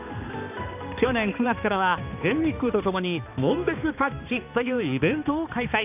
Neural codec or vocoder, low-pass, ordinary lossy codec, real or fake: codec, 16 kHz, 2 kbps, X-Codec, HuBERT features, trained on balanced general audio; 3.6 kHz; none; fake